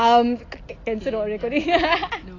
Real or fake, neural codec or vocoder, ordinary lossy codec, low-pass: real; none; AAC, 32 kbps; 7.2 kHz